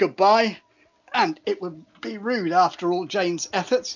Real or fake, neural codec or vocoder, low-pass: real; none; 7.2 kHz